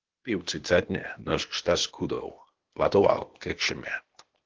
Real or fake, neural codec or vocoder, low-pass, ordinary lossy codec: fake; codec, 16 kHz, 0.8 kbps, ZipCodec; 7.2 kHz; Opus, 16 kbps